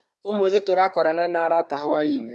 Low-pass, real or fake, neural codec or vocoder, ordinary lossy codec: none; fake; codec, 24 kHz, 1 kbps, SNAC; none